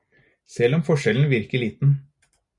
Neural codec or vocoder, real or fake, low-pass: none; real; 10.8 kHz